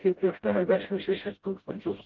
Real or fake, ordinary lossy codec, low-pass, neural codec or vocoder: fake; Opus, 24 kbps; 7.2 kHz; codec, 16 kHz, 0.5 kbps, FreqCodec, smaller model